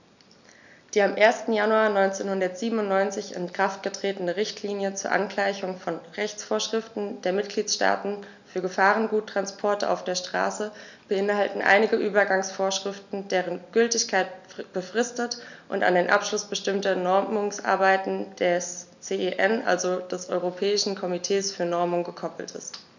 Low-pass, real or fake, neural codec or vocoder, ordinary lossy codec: 7.2 kHz; real; none; none